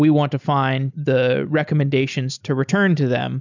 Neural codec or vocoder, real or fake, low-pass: none; real; 7.2 kHz